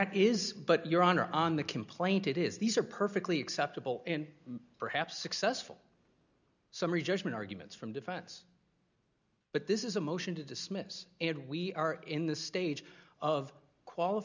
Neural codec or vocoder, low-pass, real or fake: none; 7.2 kHz; real